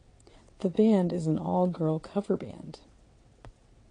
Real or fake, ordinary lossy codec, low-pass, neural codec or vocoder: fake; AAC, 48 kbps; 9.9 kHz; vocoder, 22.05 kHz, 80 mel bands, WaveNeXt